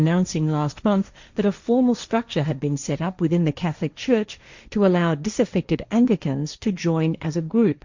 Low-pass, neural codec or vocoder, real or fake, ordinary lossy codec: 7.2 kHz; codec, 16 kHz, 1.1 kbps, Voila-Tokenizer; fake; Opus, 64 kbps